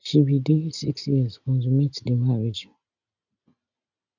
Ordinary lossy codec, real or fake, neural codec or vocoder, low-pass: none; real; none; 7.2 kHz